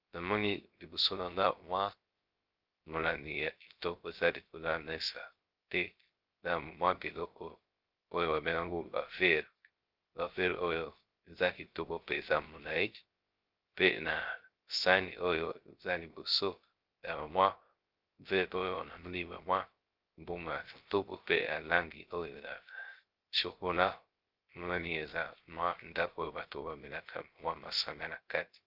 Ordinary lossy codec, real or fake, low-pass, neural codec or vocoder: Opus, 32 kbps; fake; 5.4 kHz; codec, 16 kHz, 0.3 kbps, FocalCodec